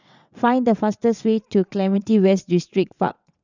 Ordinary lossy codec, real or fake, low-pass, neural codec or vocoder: none; fake; 7.2 kHz; vocoder, 22.05 kHz, 80 mel bands, WaveNeXt